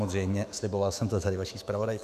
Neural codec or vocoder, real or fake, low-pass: autoencoder, 48 kHz, 128 numbers a frame, DAC-VAE, trained on Japanese speech; fake; 14.4 kHz